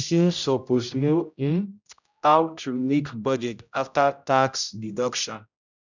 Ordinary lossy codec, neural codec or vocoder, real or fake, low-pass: none; codec, 16 kHz, 0.5 kbps, X-Codec, HuBERT features, trained on general audio; fake; 7.2 kHz